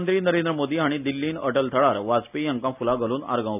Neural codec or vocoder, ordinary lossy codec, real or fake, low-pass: none; none; real; 3.6 kHz